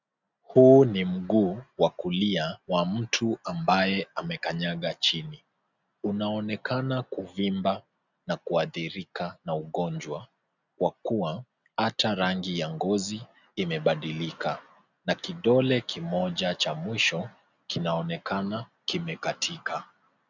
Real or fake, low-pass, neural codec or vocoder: real; 7.2 kHz; none